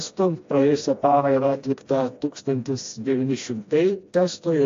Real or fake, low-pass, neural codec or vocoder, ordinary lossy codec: fake; 7.2 kHz; codec, 16 kHz, 1 kbps, FreqCodec, smaller model; AAC, 48 kbps